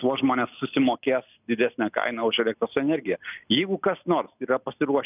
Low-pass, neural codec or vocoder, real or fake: 3.6 kHz; none; real